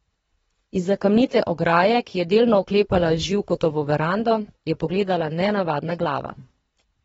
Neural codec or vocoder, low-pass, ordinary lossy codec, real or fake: codec, 24 kHz, 3 kbps, HILCodec; 10.8 kHz; AAC, 24 kbps; fake